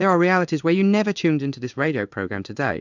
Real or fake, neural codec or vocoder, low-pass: fake; codec, 16 kHz in and 24 kHz out, 1 kbps, XY-Tokenizer; 7.2 kHz